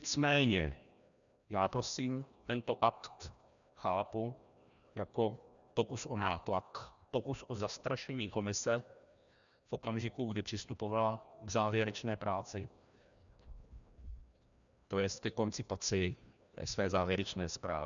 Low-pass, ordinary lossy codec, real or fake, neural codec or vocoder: 7.2 kHz; MP3, 96 kbps; fake; codec, 16 kHz, 1 kbps, FreqCodec, larger model